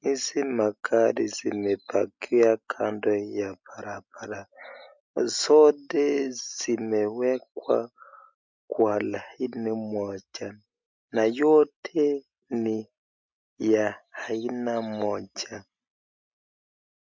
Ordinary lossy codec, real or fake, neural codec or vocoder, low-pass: MP3, 64 kbps; real; none; 7.2 kHz